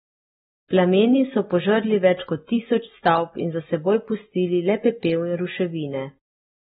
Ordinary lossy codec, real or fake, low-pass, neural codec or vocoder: AAC, 16 kbps; real; 10.8 kHz; none